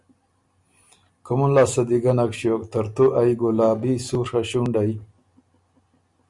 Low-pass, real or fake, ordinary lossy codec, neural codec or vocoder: 10.8 kHz; real; Opus, 64 kbps; none